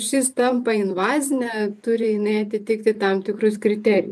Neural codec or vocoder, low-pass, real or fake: vocoder, 44.1 kHz, 128 mel bands every 512 samples, BigVGAN v2; 14.4 kHz; fake